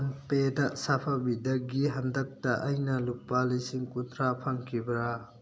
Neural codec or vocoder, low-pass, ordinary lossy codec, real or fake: none; none; none; real